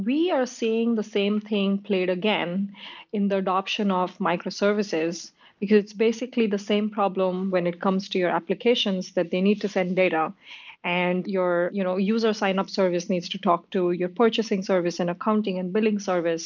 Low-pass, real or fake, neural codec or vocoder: 7.2 kHz; real; none